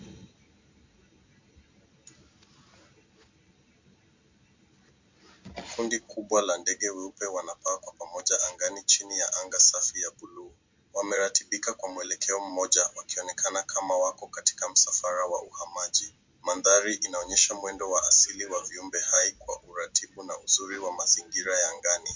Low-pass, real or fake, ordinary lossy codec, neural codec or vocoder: 7.2 kHz; real; MP3, 64 kbps; none